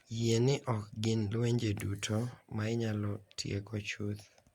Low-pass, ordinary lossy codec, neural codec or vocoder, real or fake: 19.8 kHz; Opus, 64 kbps; none; real